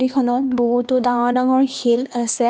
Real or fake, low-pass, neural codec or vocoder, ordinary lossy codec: fake; none; codec, 16 kHz, 2 kbps, X-Codec, HuBERT features, trained on LibriSpeech; none